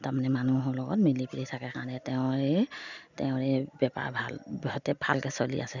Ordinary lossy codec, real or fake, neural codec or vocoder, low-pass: none; real; none; 7.2 kHz